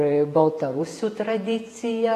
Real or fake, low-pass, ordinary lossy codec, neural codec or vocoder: fake; 14.4 kHz; AAC, 48 kbps; vocoder, 48 kHz, 128 mel bands, Vocos